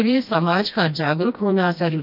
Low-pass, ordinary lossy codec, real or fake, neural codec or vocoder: 5.4 kHz; none; fake; codec, 16 kHz, 1 kbps, FreqCodec, smaller model